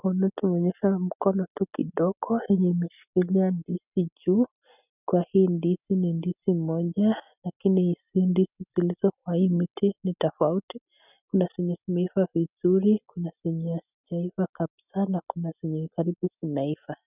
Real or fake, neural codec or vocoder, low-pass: real; none; 3.6 kHz